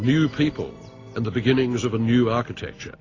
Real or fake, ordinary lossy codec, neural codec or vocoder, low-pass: real; AAC, 32 kbps; none; 7.2 kHz